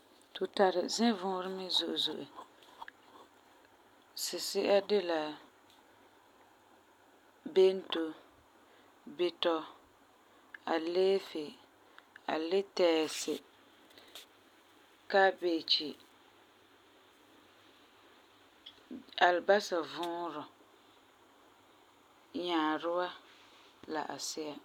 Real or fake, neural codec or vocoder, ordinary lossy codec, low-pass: real; none; none; 19.8 kHz